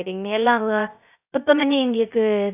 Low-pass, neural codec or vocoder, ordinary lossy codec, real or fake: 3.6 kHz; codec, 16 kHz, 0.3 kbps, FocalCodec; none; fake